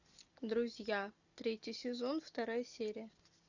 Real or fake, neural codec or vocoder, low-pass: real; none; 7.2 kHz